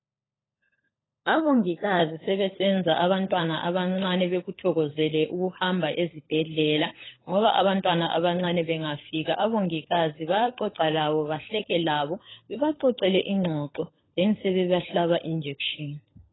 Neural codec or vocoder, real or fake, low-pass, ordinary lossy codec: codec, 16 kHz, 16 kbps, FunCodec, trained on LibriTTS, 50 frames a second; fake; 7.2 kHz; AAC, 16 kbps